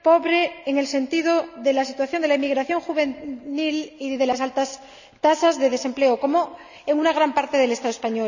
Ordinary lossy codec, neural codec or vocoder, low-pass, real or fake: none; none; 7.2 kHz; real